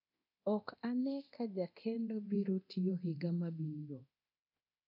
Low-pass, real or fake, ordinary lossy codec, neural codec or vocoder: 5.4 kHz; fake; none; codec, 24 kHz, 0.9 kbps, DualCodec